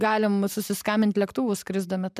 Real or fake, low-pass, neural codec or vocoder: fake; 14.4 kHz; vocoder, 44.1 kHz, 128 mel bands every 512 samples, BigVGAN v2